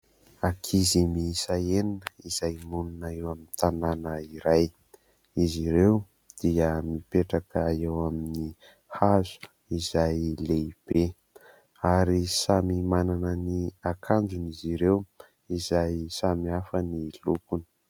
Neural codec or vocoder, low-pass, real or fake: none; 19.8 kHz; real